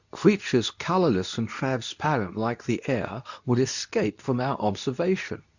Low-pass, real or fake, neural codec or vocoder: 7.2 kHz; fake; codec, 24 kHz, 0.9 kbps, WavTokenizer, medium speech release version 2